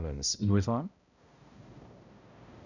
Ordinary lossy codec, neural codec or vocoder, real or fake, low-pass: none; codec, 16 kHz, 0.5 kbps, X-Codec, HuBERT features, trained on balanced general audio; fake; 7.2 kHz